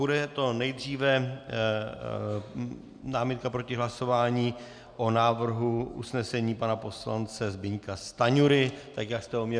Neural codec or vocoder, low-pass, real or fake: none; 9.9 kHz; real